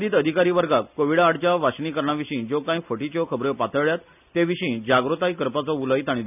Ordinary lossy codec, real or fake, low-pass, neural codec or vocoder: none; real; 3.6 kHz; none